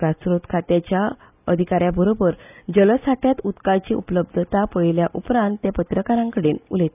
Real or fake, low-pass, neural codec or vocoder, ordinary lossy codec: real; 3.6 kHz; none; none